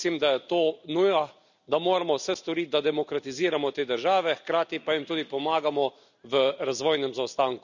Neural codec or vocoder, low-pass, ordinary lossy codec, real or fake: none; 7.2 kHz; none; real